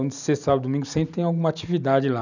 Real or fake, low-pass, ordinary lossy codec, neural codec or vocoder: real; 7.2 kHz; none; none